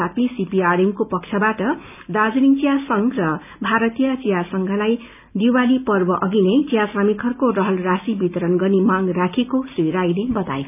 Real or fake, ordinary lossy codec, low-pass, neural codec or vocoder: real; none; 3.6 kHz; none